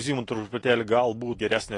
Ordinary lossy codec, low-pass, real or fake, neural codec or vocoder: AAC, 32 kbps; 10.8 kHz; real; none